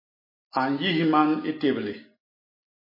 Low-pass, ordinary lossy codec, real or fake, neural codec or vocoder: 5.4 kHz; MP3, 24 kbps; real; none